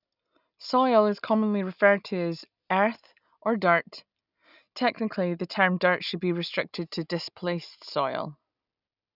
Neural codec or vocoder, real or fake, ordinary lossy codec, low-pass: none; real; none; 5.4 kHz